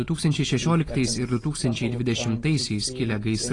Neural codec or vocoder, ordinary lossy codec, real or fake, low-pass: none; AAC, 32 kbps; real; 10.8 kHz